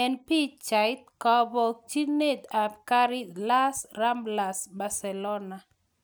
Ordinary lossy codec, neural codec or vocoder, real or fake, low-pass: none; none; real; none